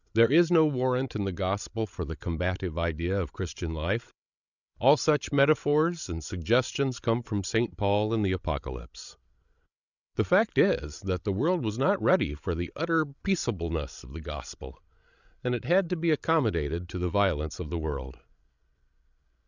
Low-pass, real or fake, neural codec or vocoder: 7.2 kHz; fake; codec, 16 kHz, 16 kbps, FreqCodec, larger model